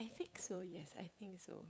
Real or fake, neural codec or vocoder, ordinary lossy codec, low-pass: fake; codec, 16 kHz, 8 kbps, FunCodec, trained on LibriTTS, 25 frames a second; none; none